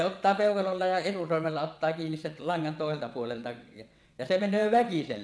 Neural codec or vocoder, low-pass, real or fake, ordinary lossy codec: vocoder, 22.05 kHz, 80 mel bands, WaveNeXt; none; fake; none